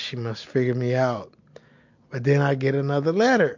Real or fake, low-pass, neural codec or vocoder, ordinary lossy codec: real; 7.2 kHz; none; MP3, 48 kbps